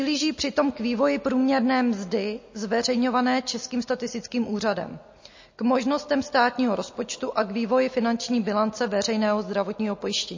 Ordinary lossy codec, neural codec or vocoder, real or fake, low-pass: MP3, 32 kbps; none; real; 7.2 kHz